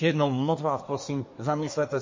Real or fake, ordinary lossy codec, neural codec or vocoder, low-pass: fake; MP3, 32 kbps; codec, 24 kHz, 1 kbps, SNAC; 7.2 kHz